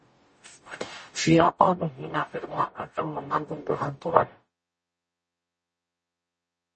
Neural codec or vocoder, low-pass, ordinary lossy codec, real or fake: codec, 44.1 kHz, 0.9 kbps, DAC; 10.8 kHz; MP3, 32 kbps; fake